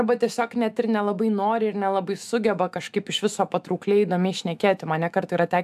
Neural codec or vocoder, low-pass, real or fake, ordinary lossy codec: autoencoder, 48 kHz, 128 numbers a frame, DAC-VAE, trained on Japanese speech; 14.4 kHz; fake; AAC, 96 kbps